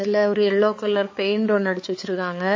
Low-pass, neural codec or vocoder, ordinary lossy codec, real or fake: 7.2 kHz; codec, 16 kHz, 4 kbps, X-Codec, HuBERT features, trained on balanced general audio; MP3, 32 kbps; fake